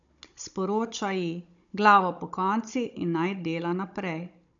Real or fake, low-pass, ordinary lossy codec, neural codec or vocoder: fake; 7.2 kHz; none; codec, 16 kHz, 16 kbps, FunCodec, trained on Chinese and English, 50 frames a second